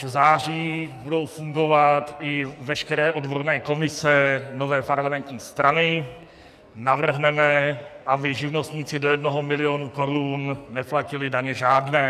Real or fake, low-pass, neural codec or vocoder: fake; 14.4 kHz; codec, 44.1 kHz, 2.6 kbps, SNAC